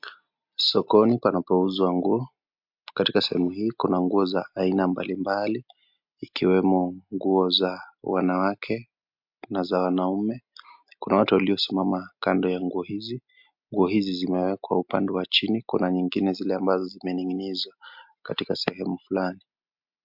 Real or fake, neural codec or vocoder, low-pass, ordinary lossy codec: real; none; 5.4 kHz; MP3, 48 kbps